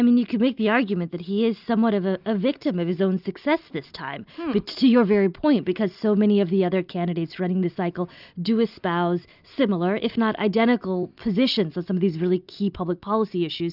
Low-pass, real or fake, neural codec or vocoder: 5.4 kHz; real; none